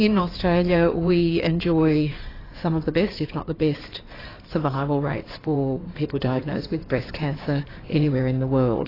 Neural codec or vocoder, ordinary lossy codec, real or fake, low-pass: codec, 16 kHz in and 24 kHz out, 2.2 kbps, FireRedTTS-2 codec; AAC, 24 kbps; fake; 5.4 kHz